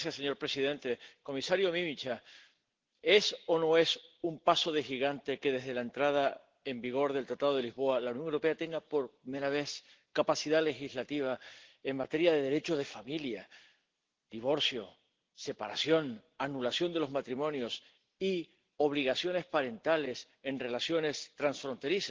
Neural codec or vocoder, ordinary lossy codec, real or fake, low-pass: none; Opus, 16 kbps; real; 7.2 kHz